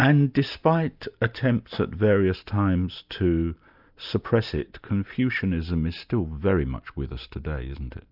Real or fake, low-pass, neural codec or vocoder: real; 5.4 kHz; none